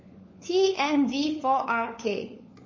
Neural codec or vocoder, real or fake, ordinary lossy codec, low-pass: codec, 16 kHz, 4 kbps, FunCodec, trained on LibriTTS, 50 frames a second; fake; MP3, 32 kbps; 7.2 kHz